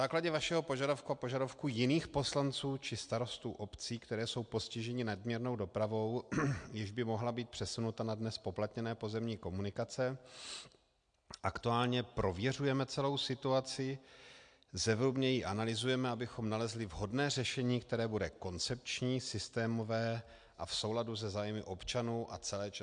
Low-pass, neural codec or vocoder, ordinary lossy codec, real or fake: 9.9 kHz; none; MP3, 64 kbps; real